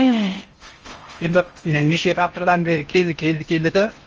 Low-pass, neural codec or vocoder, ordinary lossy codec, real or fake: 7.2 kHz; codec, 16 kHz in and 24 kHz out, 0.6 kbps, FocalCodec, streaming, 4096 codes; Opus, 24 kbps; fake